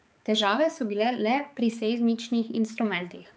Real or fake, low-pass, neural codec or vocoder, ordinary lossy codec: fake; none; codec, 16 kHz, 4 kbps, X-Codec, HuBERT features, trained on balanced general audio; none